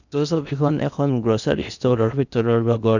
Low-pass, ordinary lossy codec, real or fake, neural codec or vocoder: 7.2 kHz; none; fake; codec, 16 kHz in and 24 kHz out, 0.8 kbps, FocalCodec, streaming, 65536 codes